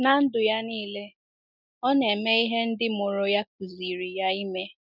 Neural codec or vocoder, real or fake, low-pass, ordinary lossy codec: none; real; 5.4 kHz; none